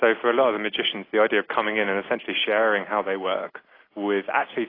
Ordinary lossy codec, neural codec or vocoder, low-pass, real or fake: AAC, 24 kbps; none; 5.4 kHz; real